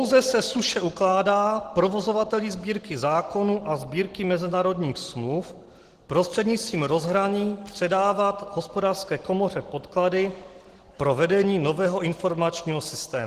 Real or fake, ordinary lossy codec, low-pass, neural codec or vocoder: real; Opus, 16 kbps; 14.4 kHz; none